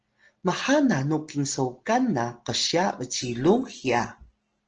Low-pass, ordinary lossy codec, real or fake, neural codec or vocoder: 7.2 kHz; Opus, 16 kbps; real; none